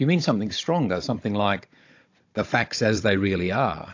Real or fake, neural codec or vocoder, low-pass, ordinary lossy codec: fake; codec, 16 kHz, 16 kbps, FunCodec, trained on Chinese and English, 50 frames a second; 7.2 kHz; AAC, 48 kbps